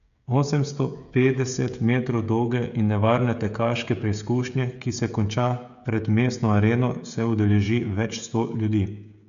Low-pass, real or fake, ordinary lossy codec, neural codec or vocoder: 7.2 kHz; fake; none; codec, 16 kHz, 16 kbps, FreqCodec, smaller model